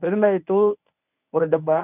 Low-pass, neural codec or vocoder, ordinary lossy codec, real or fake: 3.6 kHz; codec, 24 kHz, 0.9 kbps, WavTokenizer, medium speech release version 1; none; fake